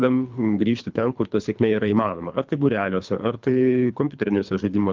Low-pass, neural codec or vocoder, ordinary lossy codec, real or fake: 7.2 kHz; codec, 24 kHz, 3 kbps, HILCodec; Opus, 24 kbps; fake